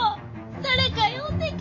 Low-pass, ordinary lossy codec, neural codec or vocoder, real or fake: 7.2 kHz; AAC, 48 kbps; none; real